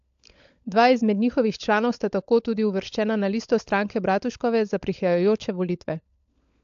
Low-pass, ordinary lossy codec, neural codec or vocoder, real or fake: 7.2 kHz; AAC, 64 kbps; codec, 16 kHz, 16 kbps, FunCodec, trained on LibriTTS, 50 frames a second; fake